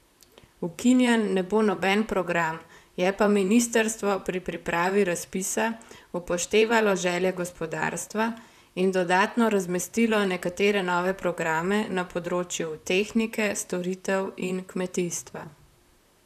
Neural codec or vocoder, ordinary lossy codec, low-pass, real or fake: vocoder, 44.1 kHz, 128 mel bands, Pupu-Vocoder; none; 14.4 kHz; fake